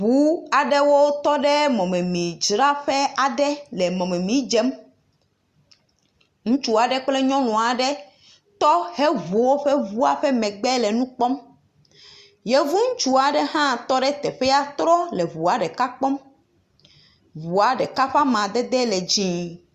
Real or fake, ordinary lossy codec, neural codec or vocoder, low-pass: real; Opus, 64 kbps; none; 14.4 kHz